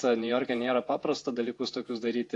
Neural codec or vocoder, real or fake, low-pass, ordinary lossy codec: vocoder, 48 kHz, 128 mel bands, Vocos; fake; 10.8 kHz; AAC, 48 kbps